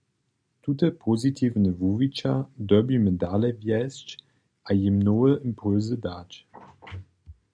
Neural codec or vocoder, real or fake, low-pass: none; real; 9.9 kHz